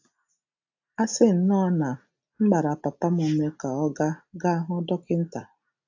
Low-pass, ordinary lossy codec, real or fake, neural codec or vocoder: 7.2 kHz; AAC, 48 kbps; real; none